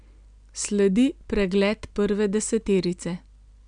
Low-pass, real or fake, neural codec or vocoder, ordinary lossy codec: 9.9 kHz; real; none; none